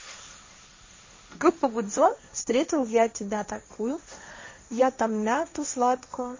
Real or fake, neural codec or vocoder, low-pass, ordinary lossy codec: fake; codec, 16 kHz, 1.1 kbps, Voila-Tokenizer; 7.2 kHz; MP3, 32 kbps